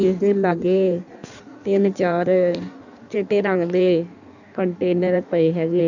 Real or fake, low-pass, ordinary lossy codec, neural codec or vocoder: fake; 7.2 kHz; none; codec, 16 kHz in and 24 kHz out, 1.1 kbps, FireRedTTS-2 codec